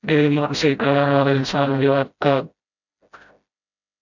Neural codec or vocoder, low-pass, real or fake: codec, 16 kHz, 0.5 kbps, FreqCodec, smaller model; 7.2 kHz; fake